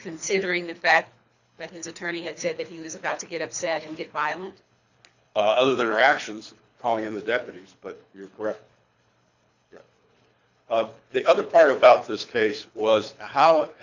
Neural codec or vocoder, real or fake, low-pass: codec, 24 kHz, 3 kbps, HILCodec; fake; 7.2 kHz